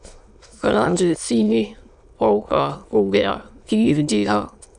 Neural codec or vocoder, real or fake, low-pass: autoencoder, 22.05 kHz, a latent of 192 numbers a frame, VITS, trained on many speakers; fake; 9.9 kHz